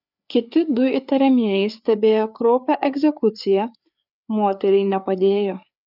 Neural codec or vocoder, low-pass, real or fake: codec, 16 kHz, 4 kbps, FreqCodec, larger model; 5.4 kHz; fake